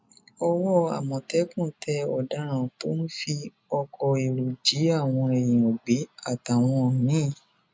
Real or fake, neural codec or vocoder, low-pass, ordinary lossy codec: real; none; none; none